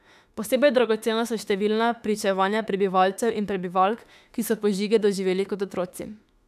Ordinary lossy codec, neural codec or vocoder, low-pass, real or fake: none; autoencoder, 48 kHz, 32 numbers a frame, DAC-VAE, trained on Japanese speech; 14.4 kHz; fake